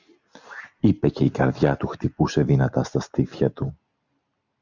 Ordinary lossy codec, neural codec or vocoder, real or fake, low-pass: Opus, 64 kbps; none; real; 7.2 kHz